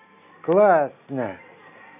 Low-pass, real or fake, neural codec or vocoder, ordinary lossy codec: 3.6 kHz; real; none; none